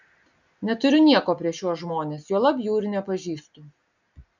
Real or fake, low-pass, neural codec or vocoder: real; 7.2 kHz; none